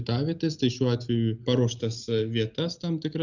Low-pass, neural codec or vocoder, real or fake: 7.2 kHz; none; real